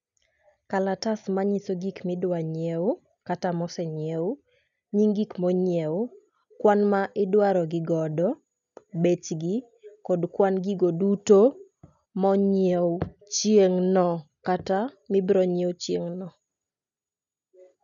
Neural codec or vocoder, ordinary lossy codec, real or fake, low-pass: none; none; real; 7.2 kHz